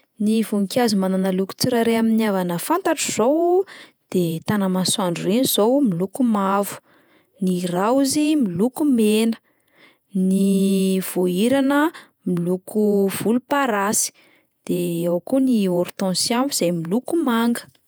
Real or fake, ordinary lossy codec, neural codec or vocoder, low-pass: fake; none; vocoder, 48 kHz, 128 mel bands, Vocos; none